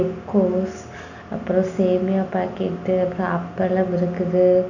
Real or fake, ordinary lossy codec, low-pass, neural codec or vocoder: real; none; 7.2 kHz; none